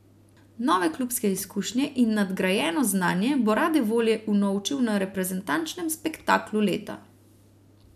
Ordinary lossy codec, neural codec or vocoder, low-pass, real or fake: none; none; 14.4 kHz; real